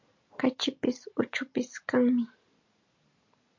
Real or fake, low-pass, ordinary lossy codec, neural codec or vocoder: real; 7.2 kHz; MP3, 48 kbps; none